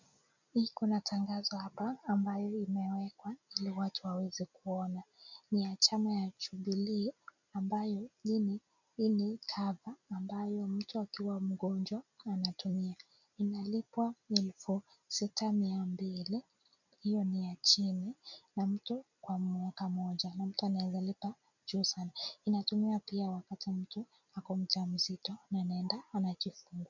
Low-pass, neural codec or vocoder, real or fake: 7.2 kHz; none; real